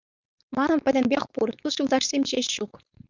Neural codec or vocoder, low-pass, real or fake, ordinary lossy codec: codec, 16 kHz, 4.8 kbps, FACodec; 7.2 kHz; fake; Opus, 64 kbps